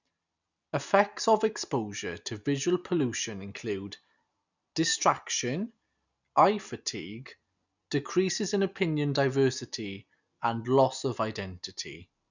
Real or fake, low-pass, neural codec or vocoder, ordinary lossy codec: real; 7.2 kHz; none; none